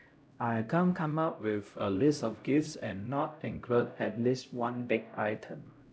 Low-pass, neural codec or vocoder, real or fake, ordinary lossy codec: none; codec, 16 kHz, 0.5 kbps, X-Codec, HuBERT features, trained on LibriSpeech; fake; none